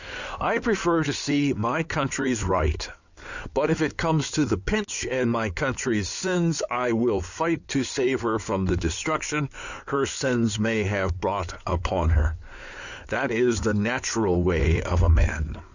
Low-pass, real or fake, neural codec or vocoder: 7.2 kHz; fake; codec, 16 kHz in and 24 kHz out, 2.2 kbps, FireRedTTS-2 codec